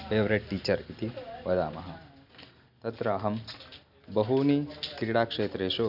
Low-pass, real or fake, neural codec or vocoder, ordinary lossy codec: 5.4 kHz; real; none; none